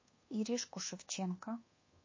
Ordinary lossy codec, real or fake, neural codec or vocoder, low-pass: MP3, 32 kbps; fake; codec, 24 kHz, 1.2 kbps, DualCodec; 7.2 kHz